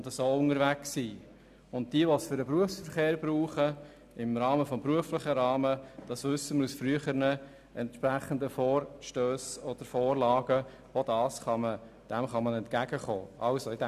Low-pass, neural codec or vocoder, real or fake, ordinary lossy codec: 14.4 kHz; none; real; none